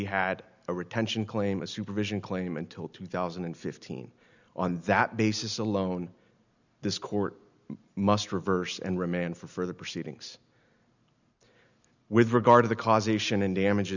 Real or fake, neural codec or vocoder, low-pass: real; none; 7.2 kHz